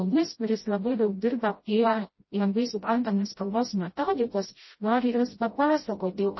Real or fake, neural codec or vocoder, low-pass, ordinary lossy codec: fake; codec, 16 kHz, 0.5 kbps, FreqCodec, smaller model; 7.2 kHz; MP3, 24 kbps